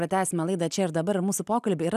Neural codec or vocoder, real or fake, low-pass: none; real; 14.4 kHz